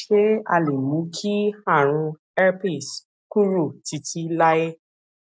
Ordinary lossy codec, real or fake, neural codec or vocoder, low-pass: none; real; none; none